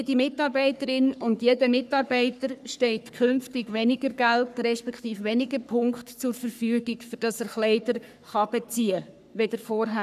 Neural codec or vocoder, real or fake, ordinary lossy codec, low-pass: codec, 44.1 kHz, 3.4 kbps, Pupu-Codec; fake; none; 14.4 kHz